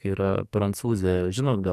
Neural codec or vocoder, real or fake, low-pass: codec, 44.1 kHz, 2.6 kbps, SNAC; fake; 14.4 kHz